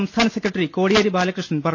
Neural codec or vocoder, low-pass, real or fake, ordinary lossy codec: none; 7.2 kHz; real; none